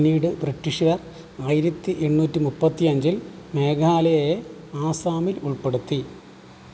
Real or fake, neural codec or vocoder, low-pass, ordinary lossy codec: real; none; none; none